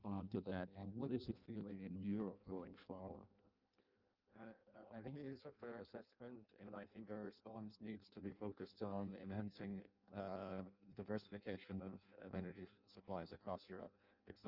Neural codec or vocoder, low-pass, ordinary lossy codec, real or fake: codec, 16 kHz in and 24 kHz out, 0.6 kbps, FireRedTTS-2 codec; 5.4 kHz; Opus, 24 kbps; fake